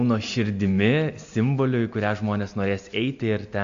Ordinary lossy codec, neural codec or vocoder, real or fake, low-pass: MP3, 64 kbps; none; real; 7.2 kHz